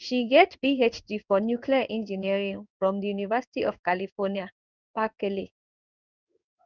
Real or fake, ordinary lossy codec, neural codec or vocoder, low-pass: fake; none; codec, 16 kHz in and 24 kHz out, 1 kbps, XY-Tokenizer; 7.2 kHz